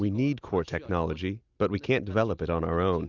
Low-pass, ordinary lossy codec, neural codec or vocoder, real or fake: 7.2 kHz; Opus, 64 kbps; none; real